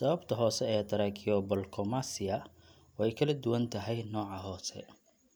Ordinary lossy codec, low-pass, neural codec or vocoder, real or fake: none; none; none; real